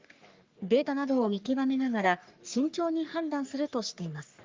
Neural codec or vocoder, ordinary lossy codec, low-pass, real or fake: codec, 44.1 kHz, 3.4 kbps, Pupu-Codec; Opus, 24 kbps; 7.2 kHz; fake